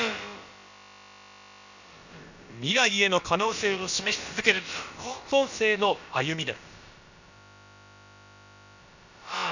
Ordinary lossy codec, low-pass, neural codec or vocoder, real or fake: none; 7.2 kHz; codec, 16 kHz, about 1 kbps, DyCAST, with the encoder's durations; fake